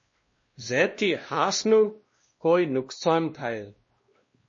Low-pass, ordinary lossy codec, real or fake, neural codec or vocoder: 7.2 kHz; MP3, 32 kbps; fake; codec, 16 kHz, 1 kbps, X-Codec, WavLM features, trained on Multilingual LibriSpeech